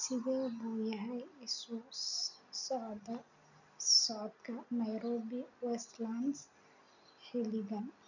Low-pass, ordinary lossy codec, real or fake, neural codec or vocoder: 7.2 kHz; none; real; none